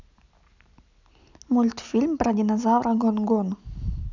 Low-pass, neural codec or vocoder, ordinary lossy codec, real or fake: 7.2 kHz; none; none; real